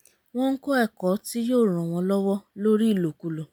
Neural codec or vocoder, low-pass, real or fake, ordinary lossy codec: none; none; real; none